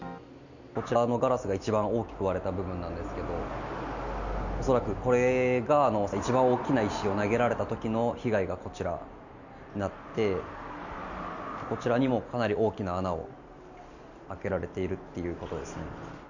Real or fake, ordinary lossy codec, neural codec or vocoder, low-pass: real; none; none; 7.2 kHz